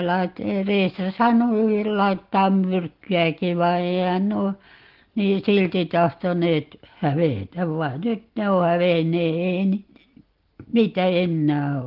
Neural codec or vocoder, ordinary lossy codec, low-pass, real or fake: none; Opus, 16 kbps; 5.4 kHz; real